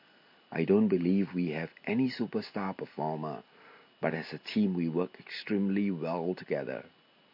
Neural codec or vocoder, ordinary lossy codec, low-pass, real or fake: none; MP3, 32 kbps; 5.4 kHz; real